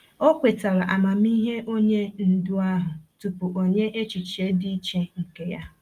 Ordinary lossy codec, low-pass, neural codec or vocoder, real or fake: Opus, 32 kbps; 14.4 kHz; none; real